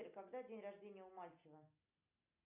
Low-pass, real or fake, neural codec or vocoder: 3.6 kHz; real; none